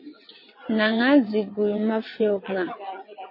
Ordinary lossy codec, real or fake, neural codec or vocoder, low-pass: MP3, 24 kbps; fake; vocoder, 22.05 kHz, 80 mel bands, WaveNeXt; 5.4 kHz